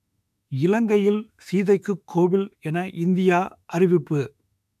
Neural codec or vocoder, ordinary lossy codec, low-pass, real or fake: autoencoder, 48 kHz, 32 numbers a frame, DAC-VAE, trained on Japanese speech; none; 14.4 kHz; fake